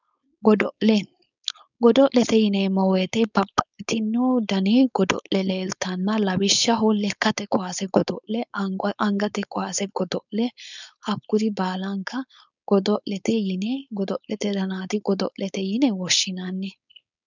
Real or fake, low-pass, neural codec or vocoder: fake; 7.2 kHz; codec, 16 kHz, 4.8 kbps, FACodec